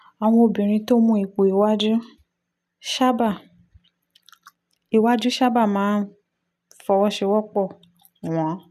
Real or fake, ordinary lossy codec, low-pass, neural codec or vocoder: real; none; 14.4 kHz; none